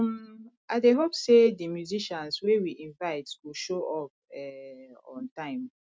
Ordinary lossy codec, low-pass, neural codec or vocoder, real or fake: none; none; none; real